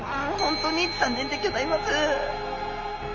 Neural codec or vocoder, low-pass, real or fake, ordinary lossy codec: none; 7.2 kHz; real; Opus, 32 kbps